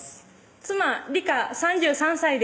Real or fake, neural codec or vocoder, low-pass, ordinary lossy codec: real; none; none; none